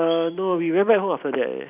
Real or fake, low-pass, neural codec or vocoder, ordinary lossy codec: fake; 3.6 kHz; vocoder, 44.1 kHz, 128 mel bands every 256 samples, BigVGAN v2; none